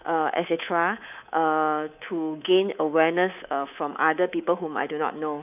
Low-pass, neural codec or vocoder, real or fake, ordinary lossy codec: 3.6 kHz; codec, 24 kHz, 3.1 kbps, DualCodec; fake; none